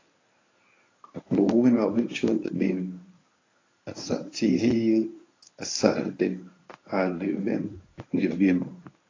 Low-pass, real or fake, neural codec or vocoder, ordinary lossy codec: 7.2 kHz; fake; codec, 24 kHz, 0.9 kbps, WavTokenizer, medium speech release version 1; AAC, 32 kbps